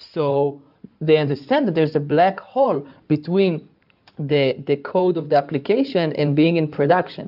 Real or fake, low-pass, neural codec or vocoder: fake; 5.4 kHz; codec, 16 kHz in and 24 kHz out, 2.2 kbps, FireRedTTS-2 codec